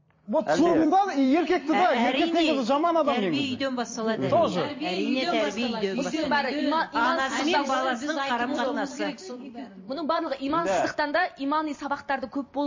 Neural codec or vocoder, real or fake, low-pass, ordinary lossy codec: vocoder, 44.1 kHz, 128 mel bands every 256 samples, BigVGAN v2; fake; 7.2 kHz; MP3, 32 kbps